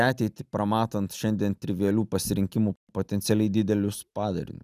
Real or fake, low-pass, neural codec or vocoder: real; 14.4 kHz; none